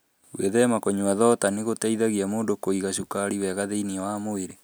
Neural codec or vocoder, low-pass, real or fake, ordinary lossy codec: none; none; real; none